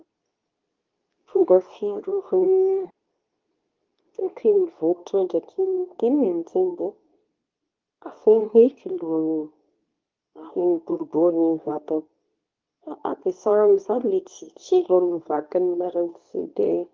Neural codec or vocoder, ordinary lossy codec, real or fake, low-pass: codec, 24 kHz, 0.9 kbps, WavTokenizer, medium speech release version 2; Opus, 32 kbps; fake; 7.2 kHz